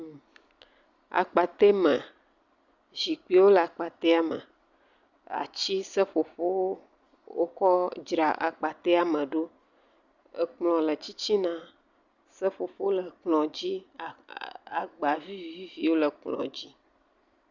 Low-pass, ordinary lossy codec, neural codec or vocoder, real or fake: 7.2 kHz; Opus, 64 kbps; none; real